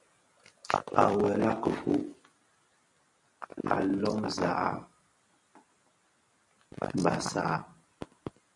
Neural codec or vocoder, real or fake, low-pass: vocoder, 44.1 kHz, 128 mel bands every 256 samples, BigVGAN v2; fake; 10.8 kHz